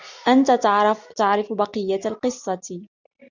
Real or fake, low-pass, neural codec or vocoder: real; 7.2 kHz; none